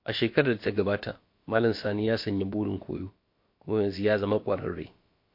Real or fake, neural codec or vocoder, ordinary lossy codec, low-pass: fake; codec, 16 kHz, about 1 kbps, DyCAST, with the encoder's durations; MP3, 32 kbps; 5.4 kHz